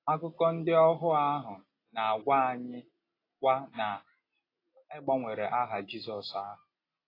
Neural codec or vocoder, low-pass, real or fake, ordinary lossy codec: none; 5.4 kHz; real; AAC, 24 kbps